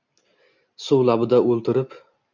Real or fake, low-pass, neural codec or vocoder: real; 7.2 kHz; none